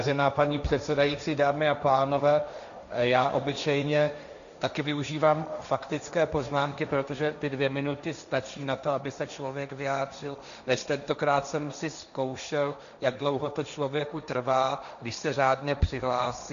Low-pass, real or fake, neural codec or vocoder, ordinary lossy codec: 7.2 kHz; fake; codec, 16 kHz, 1.1 kbps, Voila-Tokenizer; MP3, 96 kbps